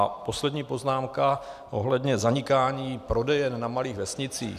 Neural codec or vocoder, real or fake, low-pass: none; real; 14.4 kHz